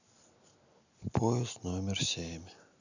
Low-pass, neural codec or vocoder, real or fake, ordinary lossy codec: 7.2 kHz; none; real; none